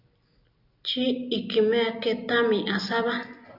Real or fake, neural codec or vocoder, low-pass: real; none; 5.4 kHz